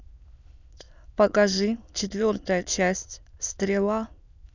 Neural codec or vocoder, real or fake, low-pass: autoencoder, 22.05 kHz, a latent of 192 numbers a frame, VITS, trained on many speakers; fake; 7.2 kHz